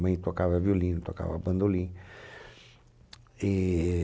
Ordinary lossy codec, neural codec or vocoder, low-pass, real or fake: none; none; none; real